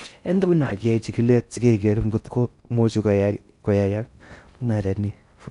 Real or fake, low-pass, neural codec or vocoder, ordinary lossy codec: fake; 10.8 kHz; codec, 16 kHz in and 24 kHz out, 0.6 kbps, FocalCodec, streaming, 4096 codes; none